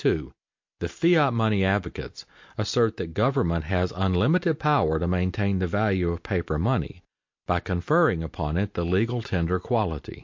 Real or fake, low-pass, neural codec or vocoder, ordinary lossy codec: real; 7.2 kHz; none; MP3, 48 kbps